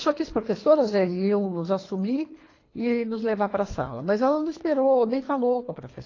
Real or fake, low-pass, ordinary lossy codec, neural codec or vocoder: fake; 7.2 kHz; AAC, 32 kbps; codec, 24 kHz, 3 kbps, HILCodec